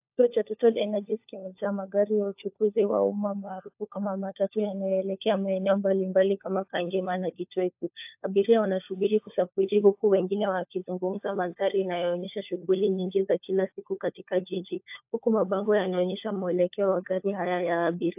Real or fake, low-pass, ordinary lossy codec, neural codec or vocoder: fake; 3.6 kHz; AAC, 32 kbps; codec, 16 kHz, 4 kbps, FunCodec, trained on LibriTTS, 50 frames a second